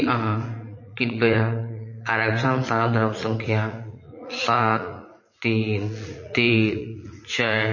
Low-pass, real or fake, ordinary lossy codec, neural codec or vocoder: 7.2 kHz; fake; MP3, 32 kbps; vocoder, 44.1 kHz, 80 mel bands, Vocos